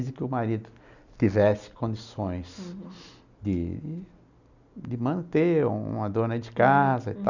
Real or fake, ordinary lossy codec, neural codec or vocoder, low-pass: real; none; none; 7.2 kHz